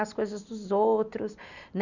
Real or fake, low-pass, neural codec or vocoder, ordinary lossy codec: real; 7.2 kHz; none; none